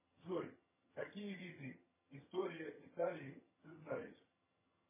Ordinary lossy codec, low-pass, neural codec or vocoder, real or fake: MP3, 16 kbps; 3.6 kHz; vocoder, 22.05 kHz, 80 mel bands, HiFi-GAN; fake